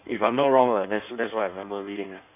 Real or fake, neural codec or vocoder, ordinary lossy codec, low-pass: fake; codec, 16 kHz in and 24 kHz out, 1.1 kbps, FireRedTTS-2 codec; none; 3.6 kHz